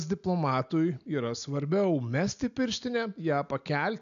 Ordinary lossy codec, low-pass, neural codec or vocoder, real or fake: MP3, 96 kbps; 7.2 kHz; none; real